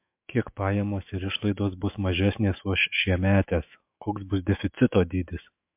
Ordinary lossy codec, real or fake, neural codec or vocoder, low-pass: MP3, 32 kbps; fake; autoencoder, 48 kHz, 128 numbers a frame, DAC-VAE, trained on Japanese speech; 3.6 kHz